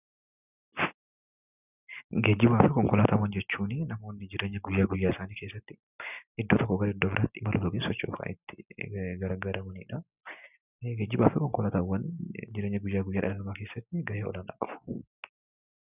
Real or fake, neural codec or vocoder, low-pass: real; none; 3.6 kHz